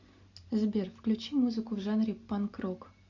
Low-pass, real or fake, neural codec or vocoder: 7.2 kHz; real; none